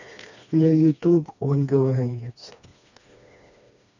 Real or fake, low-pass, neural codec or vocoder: fake; 7.2 kHz; codec, 16 kHz, 2 kbps, FreqCodec, smaller model